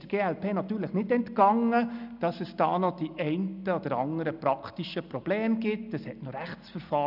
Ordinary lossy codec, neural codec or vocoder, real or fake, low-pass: none; none; real; 5.4 kHz